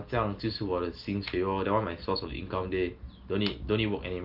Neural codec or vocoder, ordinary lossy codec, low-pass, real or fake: none; Opus, 32 kbps; 5.4 kHz; real